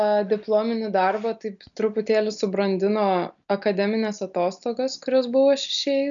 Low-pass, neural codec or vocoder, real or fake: 7.2 kHz; none; real